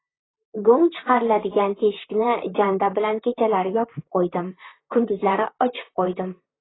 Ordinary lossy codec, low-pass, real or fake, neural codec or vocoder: AAC, 16 kbps; 7.2 kHz; fake; vocoder, 44.1 kHz, 128 mel bands, Pupu-Vocoder